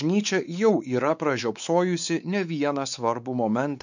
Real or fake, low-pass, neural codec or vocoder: fake; 7.2 kHz; codec, 16 kHz, 4 kbps, X-Codec, WavLM features, trained on Multilingual LibriSpeech